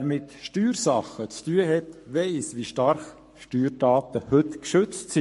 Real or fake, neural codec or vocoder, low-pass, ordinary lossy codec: fake; codec, 44.1 kHz, 7.8 kbps, Pupu-Codec; 14.4 kHz; MP3, 48 kbps